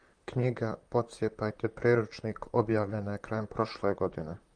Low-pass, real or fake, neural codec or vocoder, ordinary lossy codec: 9.9 kHz; fake; vocoder, 44.1 kHz, 128 mel bands, Pupu-Vocoder; Opus, 32 kbps